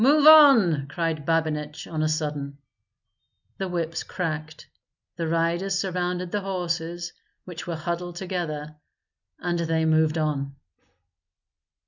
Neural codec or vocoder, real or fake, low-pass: none; real; 7.2 kHz